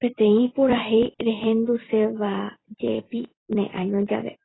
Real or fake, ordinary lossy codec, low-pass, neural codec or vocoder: fake; AAC, 16 kbps; 7.2 kHz; vocoder, 44.1 kHz, 128 mel bands every 256 samples, BigVGAN v2